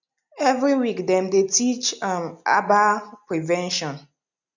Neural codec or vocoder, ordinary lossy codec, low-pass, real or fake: none; none; 7.2 kHz; real